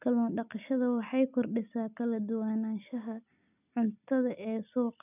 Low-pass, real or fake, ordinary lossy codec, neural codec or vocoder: 3.6 kHz; real; none; none